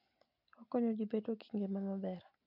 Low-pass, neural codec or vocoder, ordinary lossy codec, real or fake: 5.4 kHz; none; none; real